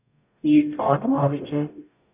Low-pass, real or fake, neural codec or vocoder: 3.6 kHz; fake; codec, 44.1 kHz, 0.9 kbps, DAC